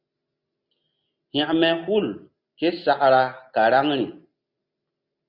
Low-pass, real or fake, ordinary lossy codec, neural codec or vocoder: 5.4 kHz; real; Opus, 64 kbps; none